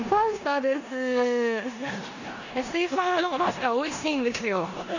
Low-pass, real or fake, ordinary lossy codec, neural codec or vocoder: 7.2 kHz; fake; none; codec, 16 kHz in and 24 kHz out, 0.9 kbps, LongCat-Audio-Codec, four codebook decoder